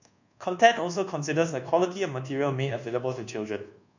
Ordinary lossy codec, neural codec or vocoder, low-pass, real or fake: none; codec, 24 kHz, 1.2 kbps, DualCodec; 7.2 kHz; fake